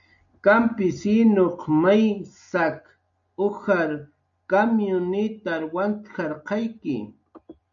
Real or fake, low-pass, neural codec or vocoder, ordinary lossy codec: real; 7.2 kHz; none; MP3, 64 kbps